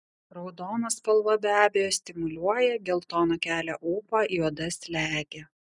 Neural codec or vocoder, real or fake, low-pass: none; real; 10.8 kHz